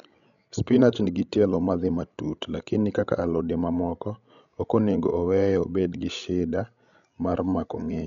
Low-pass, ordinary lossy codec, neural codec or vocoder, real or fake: 7.2 kHz; none; codec, 16 kHz, 16 kbps, FreqCodec, larger model; fake